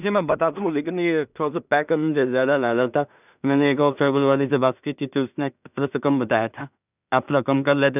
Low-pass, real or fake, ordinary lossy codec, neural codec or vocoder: 3.6 kHz; fake; none; codec, 16 kHz in and 24 kHz out, 0.4 kbps, LongCat-Audio-Codec, two codebook decoder